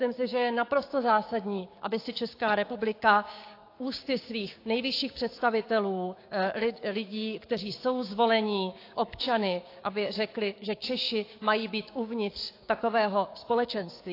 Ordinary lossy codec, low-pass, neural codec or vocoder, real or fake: AAC, 32 kbps; 5.4 kHz; codec, 16 kHz, 6 kbps, DAC; fake